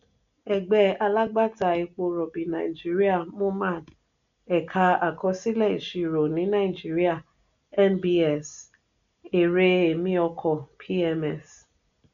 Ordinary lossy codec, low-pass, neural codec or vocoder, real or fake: none; 7.2 kHz; none; real